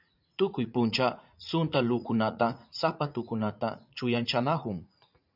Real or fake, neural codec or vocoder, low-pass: fake; vocoder, 44.1 kHz, 80 mel bands, Vocos; 5.4 kHz